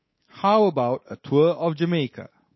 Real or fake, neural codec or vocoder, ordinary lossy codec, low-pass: fake; codec, 24 kHz, 3.1 kbps, DualCodec; MP3, 24 kbps; 7.2 kHz